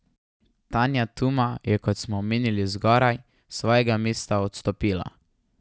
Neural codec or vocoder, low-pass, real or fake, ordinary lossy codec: none; none; real; none